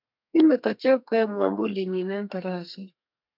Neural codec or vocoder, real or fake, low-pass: codec, 32 kHz, 1.9 kbps, SNAC; fake; 5.4 kHz